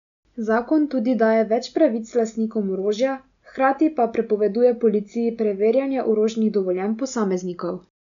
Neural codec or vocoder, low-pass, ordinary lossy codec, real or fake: none; 7.2 kHz; none; real